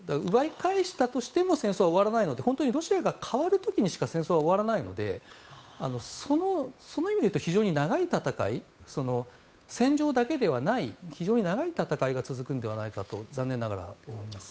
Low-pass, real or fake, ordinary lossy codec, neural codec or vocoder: none; fake; none; codec, 16 kHz, 8 kbps, FunCodec, trained on Chinese and English, 25 frames a second